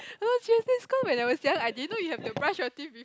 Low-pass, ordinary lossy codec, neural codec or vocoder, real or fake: none; none; none; real